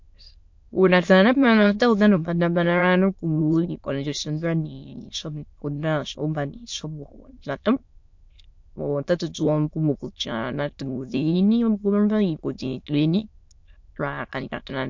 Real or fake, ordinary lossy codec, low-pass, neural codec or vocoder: fake; MP3, 48 kbps; 7.2 kHz; autoencoder, 22.05 kHz, a latent of 192 numbers a frame, VITS, trained on many speakers